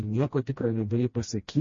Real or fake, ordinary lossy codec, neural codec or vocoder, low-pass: fake; MP3, 32 kbps; codec, 16 kHz, 1 kbps, FreqCodec, smaller model; 7.2 kHz